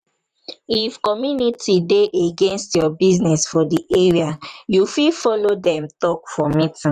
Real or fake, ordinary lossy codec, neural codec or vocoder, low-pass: fake; none; vocoder, 44.1 kHz, 128 mel bands, Pupu-Vocoder; 14.4 kHz